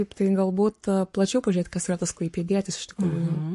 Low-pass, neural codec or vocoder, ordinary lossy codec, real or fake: 14.4 kHz; codec, 44.1 kHz, 7.8 kbps, Pupu-Codec; MP3, 48 kbps; fake